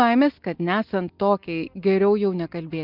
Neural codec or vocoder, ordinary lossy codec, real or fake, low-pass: none; Opus, 24 kbps; real; 5.4 kHz